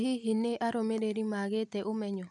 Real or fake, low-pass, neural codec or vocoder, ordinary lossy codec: real; 10.8 kHz; none; none